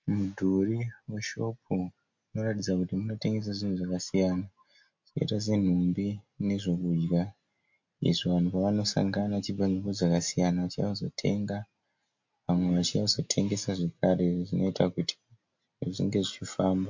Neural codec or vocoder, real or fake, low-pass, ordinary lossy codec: none; real; 7.2 kHz; MP3, 48 kbps